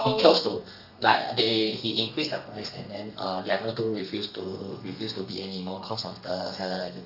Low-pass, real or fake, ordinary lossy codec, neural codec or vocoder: 5.4 kHz; fake; none; codec, 32 kHz, 1.9 kbps, SNAC